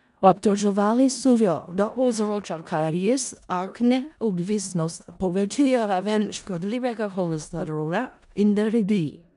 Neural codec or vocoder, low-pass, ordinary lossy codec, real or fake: codec, 16 kHz in and 24 kHz out, 0.4 kbps, LongCat-Audio-Codec, four codebook decoder; 10.8 kHz; none; fake